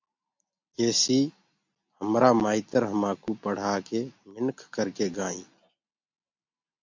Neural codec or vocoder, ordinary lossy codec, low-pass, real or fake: none; MP3, 48 kbps; 7.2 kHz; real